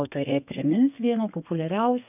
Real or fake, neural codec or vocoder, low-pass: fake; codec, 32 kHz, 1.9 kbps, SNAC; 3.6 kHz